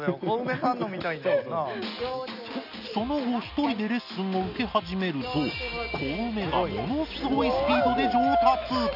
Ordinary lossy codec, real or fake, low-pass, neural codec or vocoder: none; real; 5.4 kHz; none